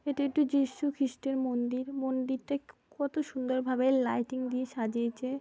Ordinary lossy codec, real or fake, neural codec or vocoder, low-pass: none; real; none; none